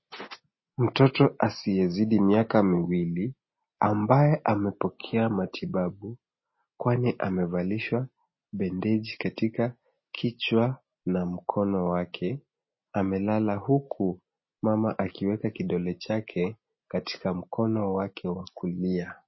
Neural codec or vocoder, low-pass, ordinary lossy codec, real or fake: none; 7.2 kHz; MP3, 24 kbps; real